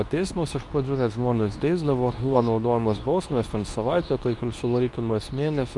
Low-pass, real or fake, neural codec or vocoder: 10.8 kHz; fake; codec, 24 kHz, 0.9 kbps, WavTokenizer, medium speech release version 2